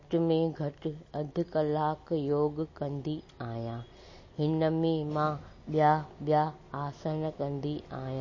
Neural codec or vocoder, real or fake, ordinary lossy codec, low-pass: none; real; MP3, 32 kbps; 7.2 kHz